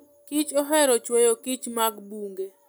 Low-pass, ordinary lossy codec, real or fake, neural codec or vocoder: none; none; real; none